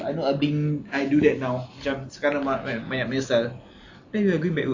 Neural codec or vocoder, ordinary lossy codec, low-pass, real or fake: none; AAC, 48 kbps; 7.2 kHz; real